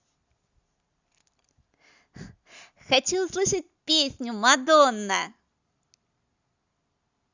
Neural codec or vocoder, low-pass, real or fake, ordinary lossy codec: none; 7.2 kHz; real; Opus, 64 kbps